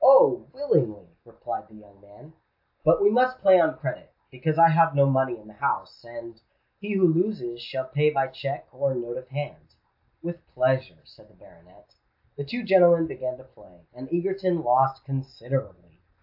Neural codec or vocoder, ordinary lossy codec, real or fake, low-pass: none; AAC, 48 kbps; real; 5.4 kHz